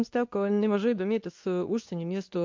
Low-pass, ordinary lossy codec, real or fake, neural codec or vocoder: 7.2 kHz; MP3, 48 kbps; fake; codec, 24 kHz, 0.9 kbps, WavTokenizer, medium speech release version 2